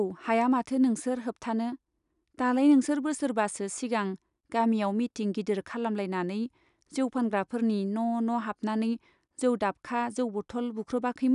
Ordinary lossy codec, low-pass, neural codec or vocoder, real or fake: none; 10.8 kHz; none; real